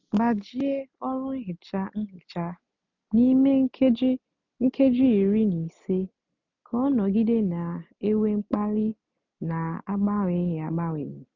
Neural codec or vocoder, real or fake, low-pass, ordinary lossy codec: none; real; 7.2 kHz; none